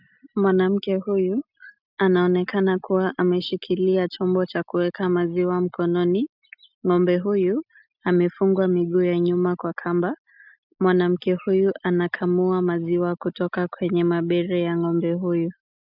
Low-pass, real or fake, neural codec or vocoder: 5.4 kHz; real; none